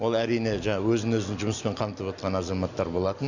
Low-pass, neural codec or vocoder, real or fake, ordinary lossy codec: 7.2 kHz; none; real; none